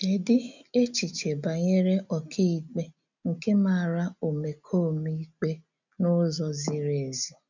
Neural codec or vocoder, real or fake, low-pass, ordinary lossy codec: none; real; 7.2 kHz; none